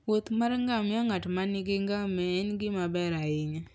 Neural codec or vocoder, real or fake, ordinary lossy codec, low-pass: none; real; none; none